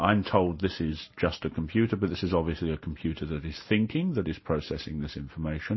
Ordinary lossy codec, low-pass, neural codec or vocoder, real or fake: MP3, 24 kbps; 7.2 kHz; none; real